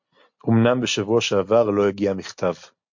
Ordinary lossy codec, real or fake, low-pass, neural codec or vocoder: MP3, 48 kbps; real; 7.2 kHz; none